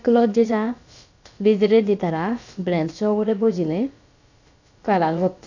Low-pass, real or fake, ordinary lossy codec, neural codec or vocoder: 7.2 kHz; fake; none; codec, 16 kHz, about 1 kbps, DyCAST, with the encoder's durations